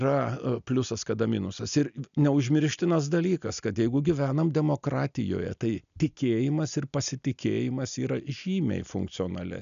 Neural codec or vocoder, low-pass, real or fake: none; 7.2 kHz; real